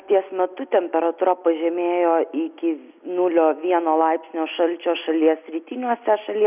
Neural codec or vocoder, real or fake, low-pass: none; real; 3.6 kHz